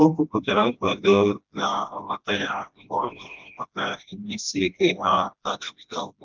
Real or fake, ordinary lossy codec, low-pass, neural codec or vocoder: fake; Opus, 24 kbps; 7.2 kHz; codec, 16 kHz, 1 kbps, FreqCodec, smaller model